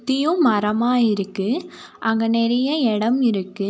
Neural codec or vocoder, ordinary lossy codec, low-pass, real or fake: none; none; none; real